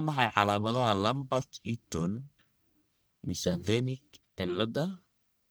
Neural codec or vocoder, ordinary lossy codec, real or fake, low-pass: codec, 44.1 kHz, 1.7 kbps, Pupu-Codec; none; fake; none